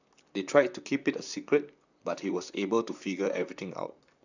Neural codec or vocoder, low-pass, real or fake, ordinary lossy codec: vocoder, 44.1 kHz, 128 mel bands, Pupu-Vocoder; 7.2 kHz; fake; none